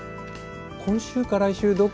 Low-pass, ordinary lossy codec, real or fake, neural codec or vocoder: none; none; real; none